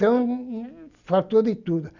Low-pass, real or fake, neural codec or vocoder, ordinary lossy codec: 7.2 kHz; real; none; none